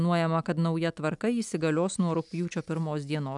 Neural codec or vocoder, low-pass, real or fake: none; 10.8 kHz; real